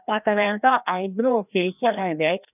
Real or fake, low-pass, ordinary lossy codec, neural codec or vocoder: fake; 3.6 kHz; none; codec, 16 kHz, 1 kbps, FreqCodec, larger model